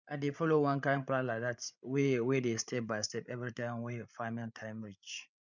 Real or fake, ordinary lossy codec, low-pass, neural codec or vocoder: fake; none; 7.2 kHz; codec, 16 kHz, 8 kbps, FreqCodec, larger model